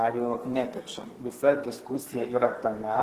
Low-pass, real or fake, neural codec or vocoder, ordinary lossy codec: 14.4 kHz; fake; codec, 32 kHz, 1.9 kbps, SNAC; Opus, 16 kbps